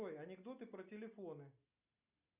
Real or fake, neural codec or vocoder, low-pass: real; none; 3.6 kHz